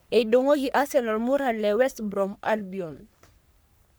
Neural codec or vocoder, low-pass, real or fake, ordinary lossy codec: codec, 44.1 kHz, 3.4 kbps, Pupu-Codec; none; fake; none